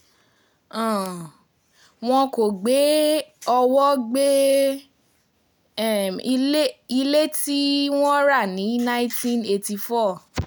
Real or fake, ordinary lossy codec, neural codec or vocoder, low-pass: real; none; none; none